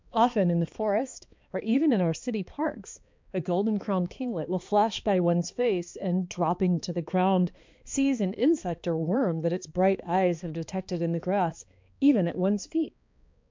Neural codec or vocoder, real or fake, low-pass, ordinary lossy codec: codec, 16 kHz, 2 kbps, X-Codec, HuBERT features, trained on balanced general audio; fake; 7.2 kHz; MP3, 64 kbps